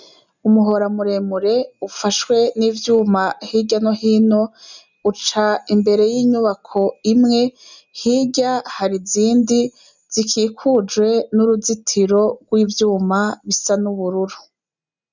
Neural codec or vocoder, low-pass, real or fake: none; 7.2 kHz; real